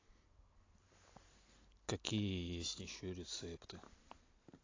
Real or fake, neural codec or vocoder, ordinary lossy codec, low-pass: real; none; AAC, 32 kbps; 7.2 kHz